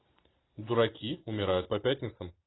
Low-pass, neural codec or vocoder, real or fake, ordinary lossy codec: 7.2 kHz; none; real; AAC, 16 kbps